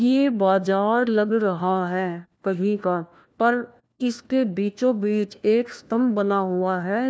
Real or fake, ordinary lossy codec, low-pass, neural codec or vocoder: fake; none; none; codec, 16 kHz, 1 kbps, FunCodec, trained on LibriTTS, 50 frames a second